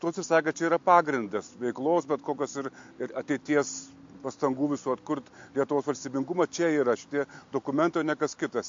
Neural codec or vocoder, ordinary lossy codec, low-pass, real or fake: none; MP3, 48 kbps; 7.2 kHz; real